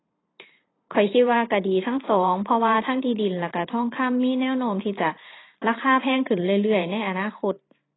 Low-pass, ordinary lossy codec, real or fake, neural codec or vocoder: 7.2 kHz; AAC, 16 kbps; fake; vocoder, 44.1 kHz, 128 mel bands every 512 samples, BigVGAN v2